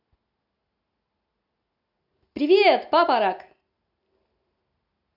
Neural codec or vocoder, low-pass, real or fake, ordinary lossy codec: none; 5.4 kHz; real; AAC, 48 kbps